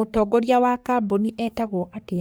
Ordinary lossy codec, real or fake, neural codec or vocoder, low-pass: none; fake; codec, 44.1 kHz, 3.4 kbps, Pupu-Codec; none